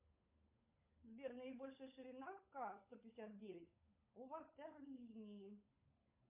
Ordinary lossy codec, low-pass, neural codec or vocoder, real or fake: MP3, 32 kbps; 3.6 kHz; codec, 16 kHz, 16 kbps, FunCodec, trained on LibriTTS, 50 frames a second; fake